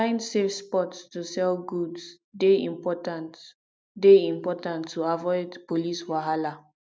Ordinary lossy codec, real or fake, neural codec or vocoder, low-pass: none; real; none; none